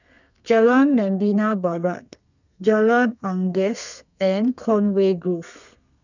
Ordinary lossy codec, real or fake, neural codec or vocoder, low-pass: none; fake; codec, 32 kHz, 1.9 kbps, SNAC; 7.2 kHz